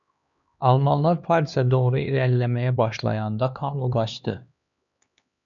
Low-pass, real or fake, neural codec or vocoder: 7.2 kHz; fake; codec, 16 kHz, 2 kbps, X-Codec, HuBERT features, trained on LibriSpeech